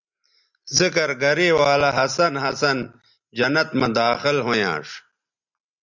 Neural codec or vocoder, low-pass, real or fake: none; 7.2 kHz; real